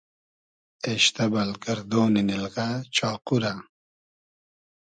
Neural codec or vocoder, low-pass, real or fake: none; 9.9 kHz; real